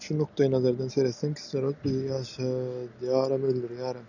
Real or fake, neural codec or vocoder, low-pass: real; none; 7.2 kHz